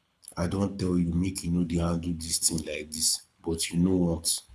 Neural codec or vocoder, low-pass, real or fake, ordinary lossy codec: codec, 24 kHz, 6 kbps, HILCodec; none; fake; none